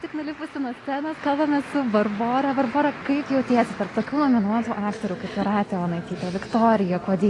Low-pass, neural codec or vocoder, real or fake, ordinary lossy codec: 10.8 kHz; none; real; AAC, 32 kbps